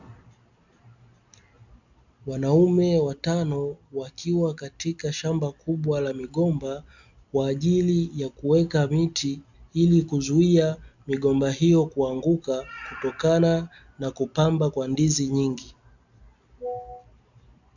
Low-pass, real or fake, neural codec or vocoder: 7.2 kHz; real; none